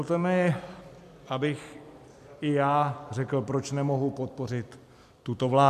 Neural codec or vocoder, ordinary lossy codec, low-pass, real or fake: vocoder, 44.1 kHz, 128 mel bands every 256 samples, BigVGAN v2; MP3, 96 kbps; 14.4 kHz; fake